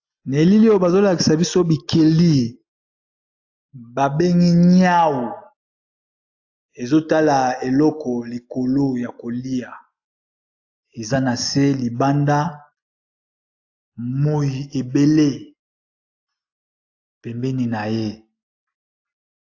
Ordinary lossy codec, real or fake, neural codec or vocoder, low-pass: AAC, 48 kbps; real; none; 7.2 kHz